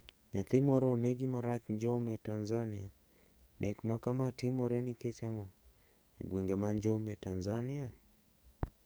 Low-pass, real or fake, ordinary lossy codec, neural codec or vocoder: none; fake; none; codec, 44.1 kHz, 2.6 kbps, SNAC